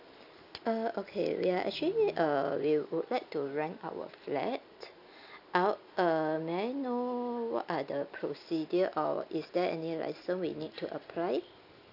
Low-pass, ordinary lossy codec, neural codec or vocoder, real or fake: 5.4 kHz; none; none; real